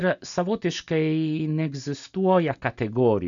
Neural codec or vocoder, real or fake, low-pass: none; real; 7.2 kHz